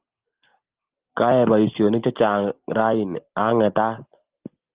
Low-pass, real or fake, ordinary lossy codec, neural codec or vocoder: 3.6 kHz; real; Opus, 16 kbps; none